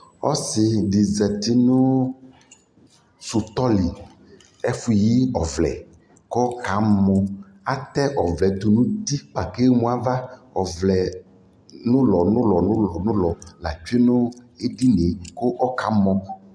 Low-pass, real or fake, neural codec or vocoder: 9.9 kHz; real; none